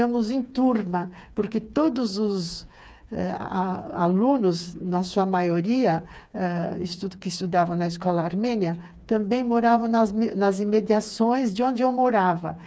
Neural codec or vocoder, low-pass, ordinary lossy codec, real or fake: codec, 16 kHz, 4 kbps, FreqCodec, smaller model; none; none; fake